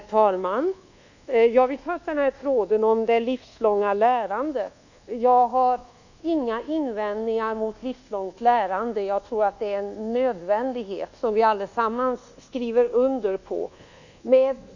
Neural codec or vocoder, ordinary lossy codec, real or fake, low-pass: codec, 24 kHz, 1.2 kbps, DualCodec; none; fake; 7.2 kHz